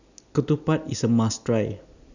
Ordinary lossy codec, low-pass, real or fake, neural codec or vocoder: none; 7.2 kHz; real; none